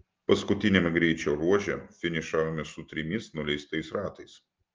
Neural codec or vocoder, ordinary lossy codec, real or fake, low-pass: none; Opus, 24 kbps; real; 7.2 kHz